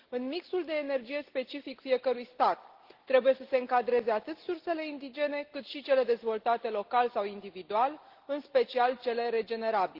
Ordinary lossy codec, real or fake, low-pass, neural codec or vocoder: Opus, 16 kbps; real; 5.4 kHz; none